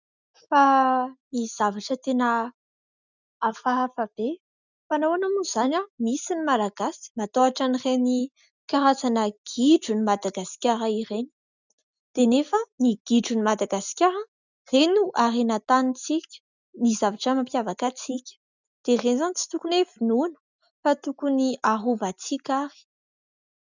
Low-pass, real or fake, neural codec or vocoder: 7.2 kHz; real; none